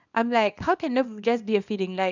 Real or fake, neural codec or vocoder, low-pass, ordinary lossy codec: fake; codec, 24 kHz, 0.9 kbps, WavTokenizer, small release; 7.2 kHz; none